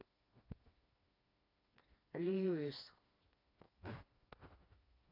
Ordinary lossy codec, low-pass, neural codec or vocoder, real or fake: AAC, 24 kbps; 5.4 kHz; codec, 16 kHz, 2 kbps, FreqCodec, smaller model; fake